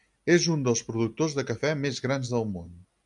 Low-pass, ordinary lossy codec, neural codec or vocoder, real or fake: 10.8 kHz; MP3, 96 kbps; none; real